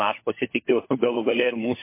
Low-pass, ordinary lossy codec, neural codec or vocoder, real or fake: 3.6 kHz; MP3, 24 kbps; codec, 16 kHz, 4 kbps, FunCodec, trained on LibriTTS, 50 frames a second; fake